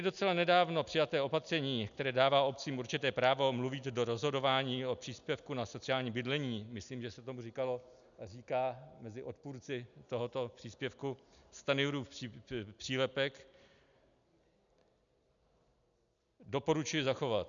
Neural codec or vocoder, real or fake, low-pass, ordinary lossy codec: none; real; 7.2 kHz; MP3, 96 kbps